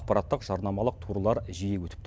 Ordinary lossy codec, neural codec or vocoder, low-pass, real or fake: none; none; none; real